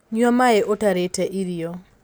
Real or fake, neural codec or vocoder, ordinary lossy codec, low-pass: real; none; none; none